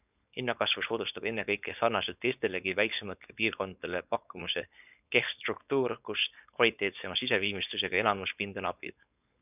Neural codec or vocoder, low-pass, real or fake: codec, 16 kHz, 4.8 kbps, FACodec; 3.6 kHz; fake